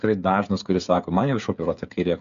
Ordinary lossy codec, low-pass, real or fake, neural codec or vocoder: MP3, 96 kbps; 7.2 kHz; fake; codec, 16 kHz, 8 kbps, FreqCodec, smaller model